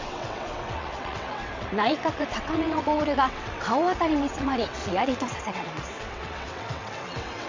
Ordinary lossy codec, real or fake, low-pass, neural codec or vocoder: MP3, 64 kbps; fake; 7.2 kHz; vocoder, 22.05 kHz, 80 mel bands, WaveNeXt